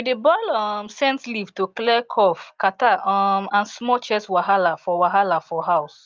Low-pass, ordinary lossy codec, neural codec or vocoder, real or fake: 7.2 kHz; Opus, 24 kbps; none; real